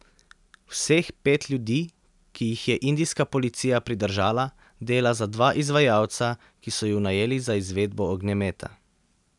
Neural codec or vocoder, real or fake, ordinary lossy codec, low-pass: none; real; none; 10.8 kHz